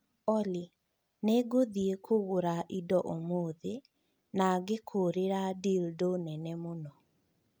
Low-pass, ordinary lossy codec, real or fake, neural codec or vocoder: none; none; real; none